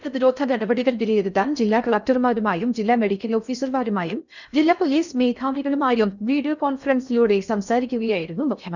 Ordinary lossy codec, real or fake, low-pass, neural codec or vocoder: none; fake; 7.2 kHz; codec, 16 kHz in and 24 kHz out, 0.6 kbps, FocalCodec, streaming, 4096 codes